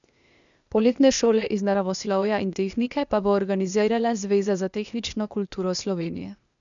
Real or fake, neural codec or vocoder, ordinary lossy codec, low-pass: fake; codec, 16 kHz, 0.8 kbps, ZipCodec; none; 7.2 kHz